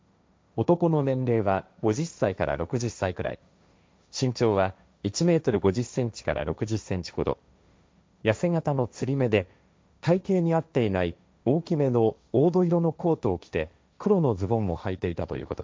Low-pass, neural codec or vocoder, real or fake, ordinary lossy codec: none; codec, 16 kHz, 1.1 kbps, Voila-Tokenizer; fake; none